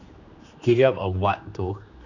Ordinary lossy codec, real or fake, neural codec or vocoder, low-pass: none; fake; codec, 16 kHz, 2 kbps, X-Codec, HuBERT features, trained on general audio; 7.2 kHz